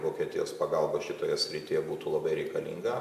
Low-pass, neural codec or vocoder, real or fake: 14.4 kHz; vocoder, 44.1 kHz, 128 mel bands every 256 samples, BigVGAN v2; fake